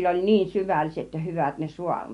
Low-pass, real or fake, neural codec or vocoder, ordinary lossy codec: 10.8 kHz; real; none; MP3, 64 kbps